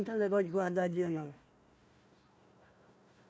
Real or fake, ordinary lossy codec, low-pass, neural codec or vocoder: fake; none; none; codec, 16 kHz, 1 kbps, FunCodec, trained on Chinese and English, 50 frames a second